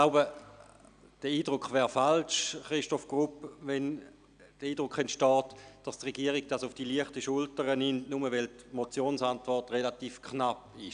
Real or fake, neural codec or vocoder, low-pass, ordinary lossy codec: real; none; 9.9 kHz; none